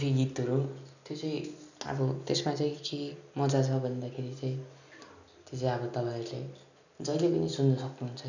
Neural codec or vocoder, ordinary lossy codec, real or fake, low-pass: none; none; real; 7.2 kHz